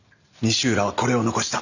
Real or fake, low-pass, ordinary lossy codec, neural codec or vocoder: real; 7.2 kHz; none; none